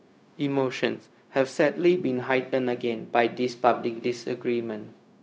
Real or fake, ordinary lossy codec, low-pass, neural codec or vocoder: fake; none; none; codec, 16 kHz, 0.4 kbps, LongCat-Audio-Codec